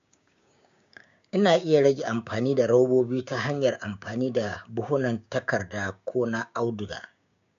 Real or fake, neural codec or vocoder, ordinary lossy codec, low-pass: fake; codec, 16 kHz, 6 kbps, DAC; MP3, 64 kbps; 7.2 kHz